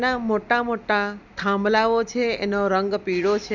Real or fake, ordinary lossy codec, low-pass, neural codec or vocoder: real; none; 7.2 kHz; none